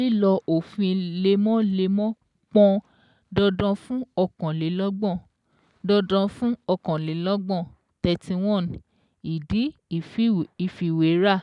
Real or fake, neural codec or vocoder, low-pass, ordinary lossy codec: real; none; 10.8 kHz; none